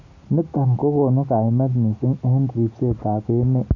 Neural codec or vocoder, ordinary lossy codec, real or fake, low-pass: none; none; real; 7.2 kHz